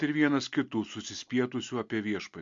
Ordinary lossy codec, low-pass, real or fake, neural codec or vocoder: MP3, 48 kbps; 7.2 kHz; real; none